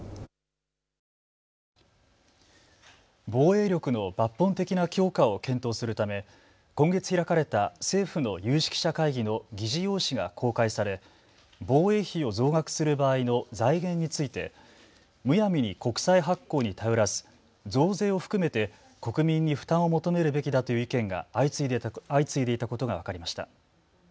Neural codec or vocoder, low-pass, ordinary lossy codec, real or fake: none; none; none; real